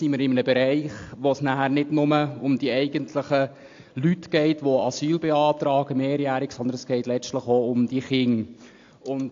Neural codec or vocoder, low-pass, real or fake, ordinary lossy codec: none; 7.2 kHz; real; AAC, 48 kbps